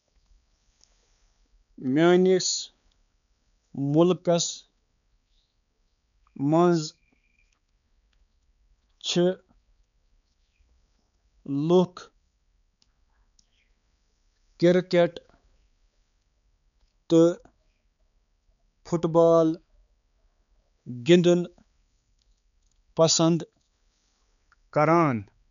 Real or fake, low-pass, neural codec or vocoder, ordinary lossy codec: fake; 7.2 kHz; codec, 16 kHz, 4 kbps, X-Codec, HuBERT features, trained on balanced general audio; none